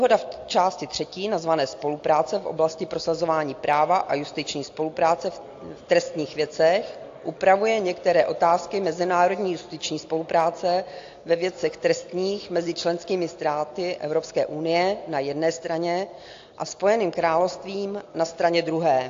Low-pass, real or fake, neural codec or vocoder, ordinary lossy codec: 7.2 kHz; real; none; AAC, 48 kbps